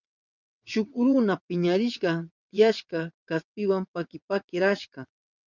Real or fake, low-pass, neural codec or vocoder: fake; 7.2 kHz; vocoder, 22.05 kHz, 80 mel bands, WaveNeXt